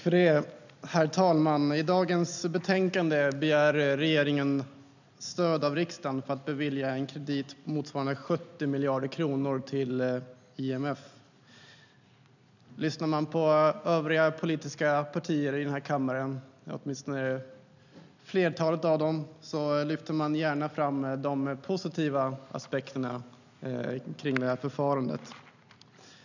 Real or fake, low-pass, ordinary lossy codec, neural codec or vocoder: real; 7.2 kHz; none; none